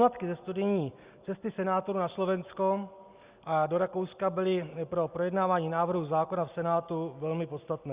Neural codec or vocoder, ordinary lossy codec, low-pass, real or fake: none; Opus, 64 kbps; 3.6 kHz; real